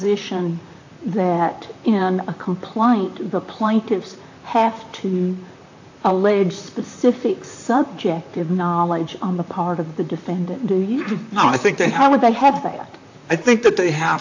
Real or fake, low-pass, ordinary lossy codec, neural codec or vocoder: fake; 7.2 kHz; AAC, 48 kbps; vocoder, 44.1 kHz, 128 mel bands, Pupu-Vocoder